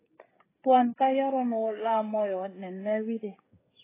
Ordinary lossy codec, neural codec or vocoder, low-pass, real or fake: AAC, 16 kbps; codec, 16 kHz, 16 kbps, FreqCodec, smaller model; 3.6 kHz; fake